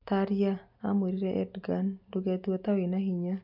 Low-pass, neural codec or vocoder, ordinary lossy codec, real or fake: 5.4 kHz; none; none; real